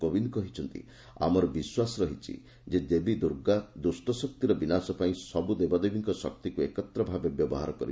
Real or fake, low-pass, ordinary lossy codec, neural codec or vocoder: real; none; none; none